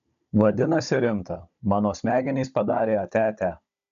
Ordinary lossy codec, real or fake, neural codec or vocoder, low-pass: AAC, 96 kbps; fake; codec, 16 kHz, 16 kbps, FunCodec, trained on Chinese and English, 50 frames a second; 7.2 kHz